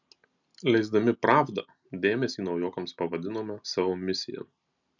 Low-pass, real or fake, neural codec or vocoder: 7.2 kHz; real; none